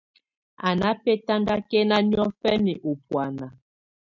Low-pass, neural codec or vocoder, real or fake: 7.2 kHz; none; real